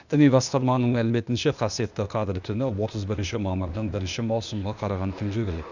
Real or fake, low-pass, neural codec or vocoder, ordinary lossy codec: fake; 7.2 kHz; codec, 16 kHz, 0.8 kbps, ZipCodec; none